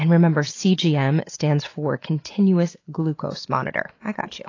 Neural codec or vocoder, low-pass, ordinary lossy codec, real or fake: none; 7.2 kHz; AAC, 32 kbps; real